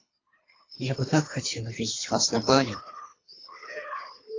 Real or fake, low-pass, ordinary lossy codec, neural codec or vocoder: fake; 7.2 kHz; AAC, 32 kbps; codec, 24 kHz, 3 kbps, HILCodec